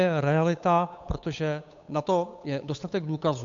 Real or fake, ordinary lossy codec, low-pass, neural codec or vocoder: fake; AAC, 64 kbps; 7.2 kHz; codec, 16 kHz, 8 kbps, FunCodec, trained on Chinese and English, 25 frames a second